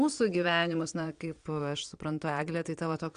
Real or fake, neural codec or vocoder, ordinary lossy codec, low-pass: fake; vocoder, 22.05 kHz, 80 mel bands, Vocos; Opus, 64 kbps; 9.9 kHz